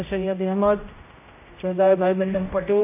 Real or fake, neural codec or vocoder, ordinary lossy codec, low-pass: fake; codec, 16 kHz, 0.5 kbps, X-Codec, HuBERT features, trained on general audio; MP3, 24 kbps; 3.6 kHz